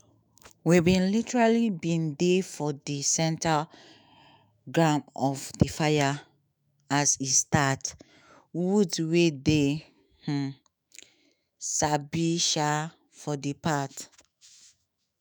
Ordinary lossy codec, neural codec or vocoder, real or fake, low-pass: none; autoencoder, 48 kHz, 128 numbers a frame, DAC-VAE, trained on Japanese speech; fake; none